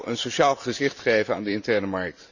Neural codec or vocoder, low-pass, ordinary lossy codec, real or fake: vocoder, 44.1 kHz, 128 mel bands every 512 samples, BigVGAN v2; 7.2 kHz; none; fake